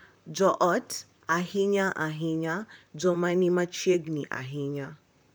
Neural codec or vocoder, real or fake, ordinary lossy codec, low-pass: vocoder, 44.1 kHz, 128 mel bands, Pupu-Vocoder; fake; none; none